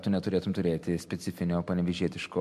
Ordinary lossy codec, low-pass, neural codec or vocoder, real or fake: MP3, 64 kbps; 14.4 kHz; none; real